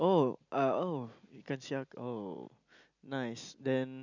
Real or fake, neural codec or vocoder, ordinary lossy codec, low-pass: real; none; none; 7.2 kHz